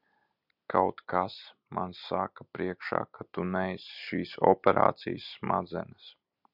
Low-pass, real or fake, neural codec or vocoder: 5.4 kHz; real; none